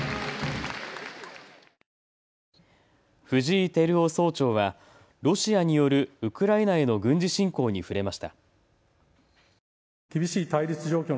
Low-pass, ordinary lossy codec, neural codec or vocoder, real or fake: none; none; none; real